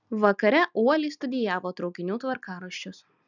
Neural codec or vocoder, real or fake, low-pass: none; real; 7.2 kHz